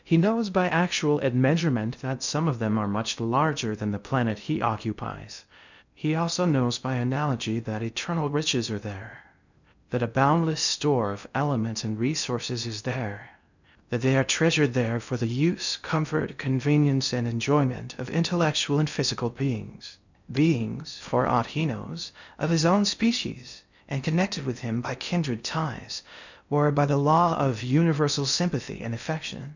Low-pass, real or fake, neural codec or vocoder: 7.2 kHz; fake; codec, 16 kHz in and 24 kHz out, 0.6 kbps, FocalCodec, streaming, 2048 codes